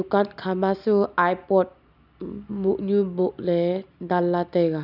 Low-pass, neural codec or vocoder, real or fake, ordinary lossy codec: 5.4 kHz; none; real; none